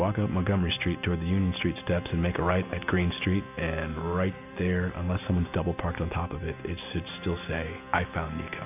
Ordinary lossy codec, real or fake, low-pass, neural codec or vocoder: AAC, 32 kbps; real; 3.6 kHz; none